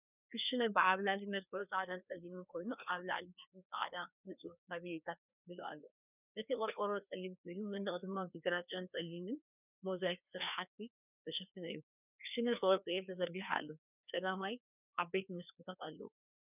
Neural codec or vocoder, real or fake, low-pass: codec, 16 kHz, 2 kbps, FreqCodec, larger model; fake; 3.6 kHz